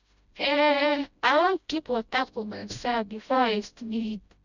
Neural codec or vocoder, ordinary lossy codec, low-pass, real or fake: codec, 16 kHz, 0.5 kbps, FreqCodec, smaller model; none; 7.2 kHz; fake